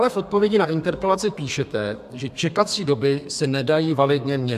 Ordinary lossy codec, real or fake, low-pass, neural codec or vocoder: AAC, 96 kbps; fake; 14.4 kHz; codec, 32 kHz, 1.9 kbps, SNAC